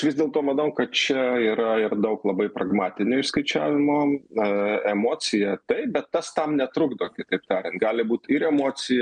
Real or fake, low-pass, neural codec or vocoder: real; 9.9 kHz; none